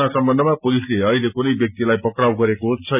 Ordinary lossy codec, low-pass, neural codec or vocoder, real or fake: none; 3.6 kHz; none; real